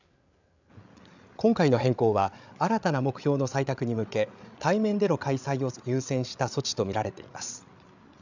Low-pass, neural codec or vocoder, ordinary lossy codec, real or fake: 7.2 kHz; codec, 16 kHz, 8 kbps, FreqCodec, larger model; none; fake